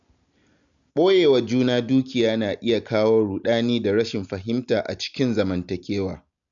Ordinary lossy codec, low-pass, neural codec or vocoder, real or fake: none; 7.2 kHz; none; real